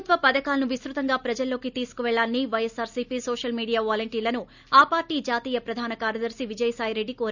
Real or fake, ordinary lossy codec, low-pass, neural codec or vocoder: real; none; 7.2 kHz; none